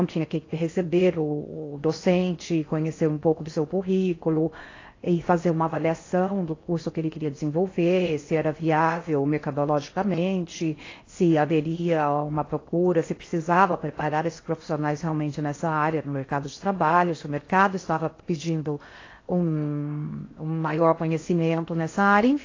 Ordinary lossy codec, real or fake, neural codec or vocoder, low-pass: AAC, 32 kbps; fake; codec, 16 kHz in and 24 kHz out, 0.6 kbps, FocalCodec, streaming, 4096 codes; 7.2 kHz